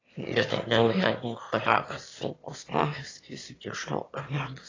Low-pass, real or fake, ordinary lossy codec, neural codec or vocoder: 7.2 kHz; fake; AAC, 32 kbps; autoencoder, 22.05 kHz, a latent of 192 numbers a frame, VITS, trained on one speaker